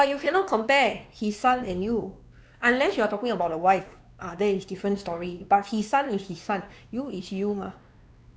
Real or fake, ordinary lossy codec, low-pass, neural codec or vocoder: fake; none; none; codec, 16 kHz, 2 kbps, X-Codec, WavLM features, trained on Multilingual LibriSpeech